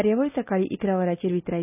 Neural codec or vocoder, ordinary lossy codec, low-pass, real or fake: none; none; 3.6 kHz; real